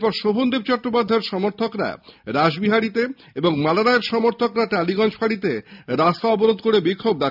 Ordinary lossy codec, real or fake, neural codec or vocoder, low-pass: none; real; none; 5.4 kHz